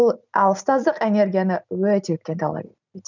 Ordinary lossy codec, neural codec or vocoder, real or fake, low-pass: none; none; real; 7.2 kHz